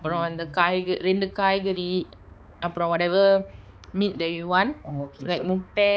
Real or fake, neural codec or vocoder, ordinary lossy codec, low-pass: fake; codec, 16 kHz, 4 kbps, X-Codec, HuBERT features, trained on balanced general audio; none; none